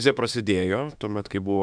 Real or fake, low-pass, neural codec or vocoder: fake; 9.9 kHz; autoencoder, 48 kHz, 32 numbers a frame, DAC-VAE, trained on Japanese speech